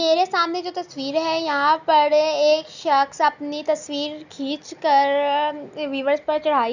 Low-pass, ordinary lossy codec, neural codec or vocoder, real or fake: 7.2 kHz; none; none; real